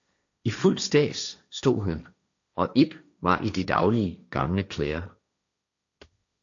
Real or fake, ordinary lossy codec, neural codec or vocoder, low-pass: fake; AAC, 64 kbps; codec, 16 kHz, 1.1 kbps, Voila-Tokenizer; 7.2 kHz